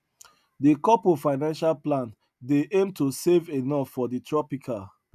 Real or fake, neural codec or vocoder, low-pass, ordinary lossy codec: real; none; 14.4 kHz; none